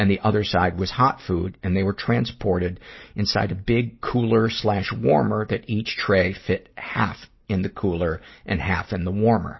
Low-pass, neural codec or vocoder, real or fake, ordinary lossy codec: 7.2 kHz; none; real; MP3, 24 kbps